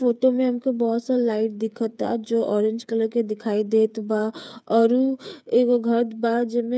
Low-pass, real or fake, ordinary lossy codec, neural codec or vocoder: none; fake; none; codec, 16 kHz, 8 kbps, FreqCodec, smaller model